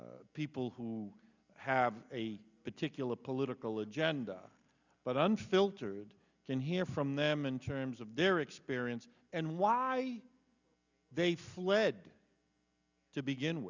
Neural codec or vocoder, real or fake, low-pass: none; real; 7.2 kHz